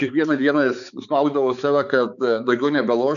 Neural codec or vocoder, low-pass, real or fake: codec, 16 kHz, 4 kbps, X-Codec, HuBERT features, trained on balanced general audio; 7.2 kHz; fake